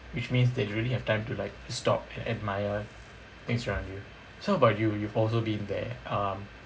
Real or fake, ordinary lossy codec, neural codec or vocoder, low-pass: real; none; none; none